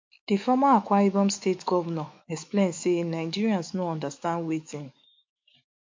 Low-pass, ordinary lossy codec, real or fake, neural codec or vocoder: 7.2 kHz; MP3, 48 kbps; fake; autoencoder, 48 kHz, 128 numbers a frame, DAC-VAE, trained on Japanese speech